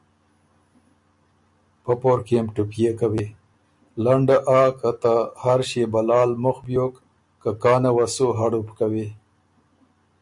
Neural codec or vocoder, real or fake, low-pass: none; real; 10.8 kHz